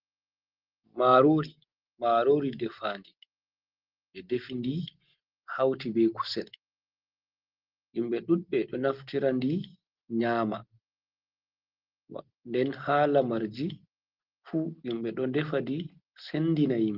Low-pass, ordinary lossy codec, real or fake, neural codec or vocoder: 5.4 kHz; Opus, 16 kbps; real; none